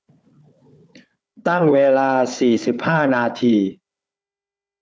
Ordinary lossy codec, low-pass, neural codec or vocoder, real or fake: none; none; codec, 16 kHz, 4 kbps, FunCodec, trained on Chinese and English, 50 frames a second; fake